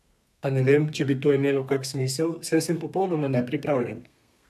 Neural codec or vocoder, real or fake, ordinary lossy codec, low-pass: codec, 32 kHz, 1.9 kbps, SNAC; fake; none; 14.4 kHz